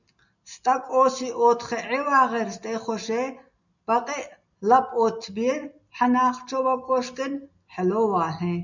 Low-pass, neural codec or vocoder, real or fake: 7.2 kHz; none; real